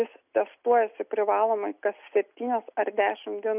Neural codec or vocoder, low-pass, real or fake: none; 3.6 kHz; real